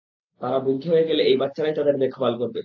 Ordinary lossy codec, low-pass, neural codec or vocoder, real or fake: AAC, 48 kbps; 7.2 kHz; none; real